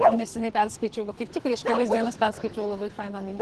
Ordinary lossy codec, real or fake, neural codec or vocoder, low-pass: Opus, 16 kbps; fake; codec, 24 kHz, 3 kbps, HILCodec; 10.8 kHz